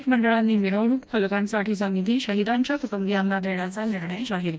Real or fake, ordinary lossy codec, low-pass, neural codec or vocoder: fake; none; none; codec, 16 kHz, 1 kbps, FreqCodec, smaller model